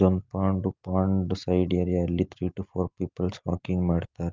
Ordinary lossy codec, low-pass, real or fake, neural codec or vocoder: Opus, 24 kbps; 7.2 kHz; real; none